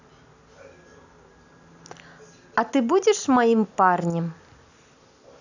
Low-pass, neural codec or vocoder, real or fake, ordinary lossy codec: 7.2 kHz; none; real; none